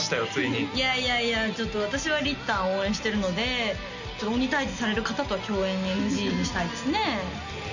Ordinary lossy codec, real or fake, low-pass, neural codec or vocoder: none; real; 7.2 kHz; none